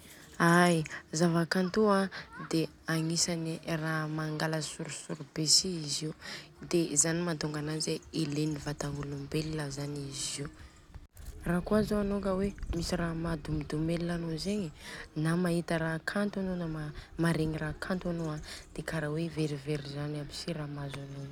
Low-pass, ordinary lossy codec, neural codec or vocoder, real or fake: 19.8 kHz; none; none; real